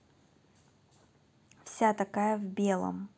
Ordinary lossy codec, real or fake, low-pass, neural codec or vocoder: none; real; none; none